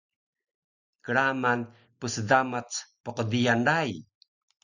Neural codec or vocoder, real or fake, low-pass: none; real; 7.2 kHz